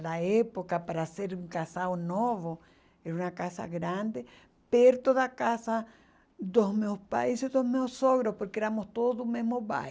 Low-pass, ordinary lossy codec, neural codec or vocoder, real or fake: none; none; none; real